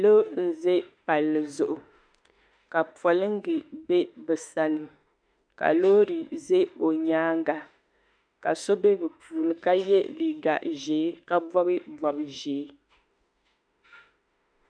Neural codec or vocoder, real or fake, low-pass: autoencoder, 48 kHz, 32 numbers a frame, DAC-VAE, trained on Japanese speech; fake; 9.9 kHz